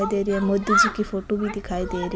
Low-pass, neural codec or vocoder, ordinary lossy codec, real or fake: none; none; none; real